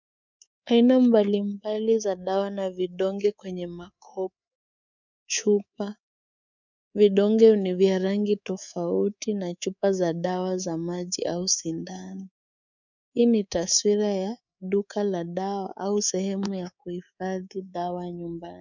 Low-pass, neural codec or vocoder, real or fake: 7.2 kHz; codec, 24 kHz, 3.1 kbps, DualCodec; fake